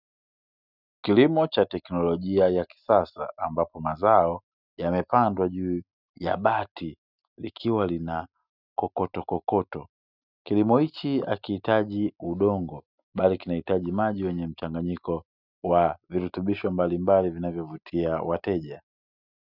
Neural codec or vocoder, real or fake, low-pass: none; real; 5.4 kHz